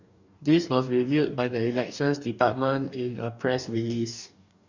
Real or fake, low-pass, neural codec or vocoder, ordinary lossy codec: fake; 7.2 kHz; codec, 44.1 kHz, 2.6 kbps, DAC; none